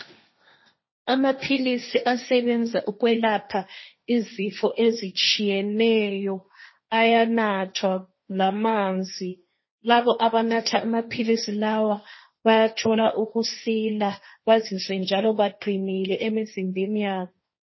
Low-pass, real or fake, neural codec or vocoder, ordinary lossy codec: 7.2 kHz; fake; codec, 16 kHz, 1.1 kbps, Voila-Tokenizer; MP3, 24 kbps